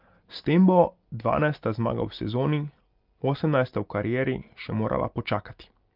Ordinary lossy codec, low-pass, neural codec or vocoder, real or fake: Opus, 24 kbps; 5.4 kHz; none; real